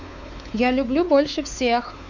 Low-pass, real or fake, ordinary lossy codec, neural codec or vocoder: 7.2 kHz; fake; none; codec, 16 kHz, 4 kbps, X-Codec, WavLM features, trained on Multilingual LibriSpeech